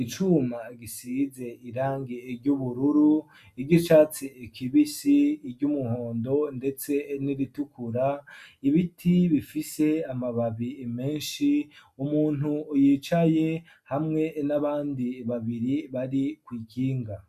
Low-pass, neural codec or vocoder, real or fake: 14.4 kHz; none; real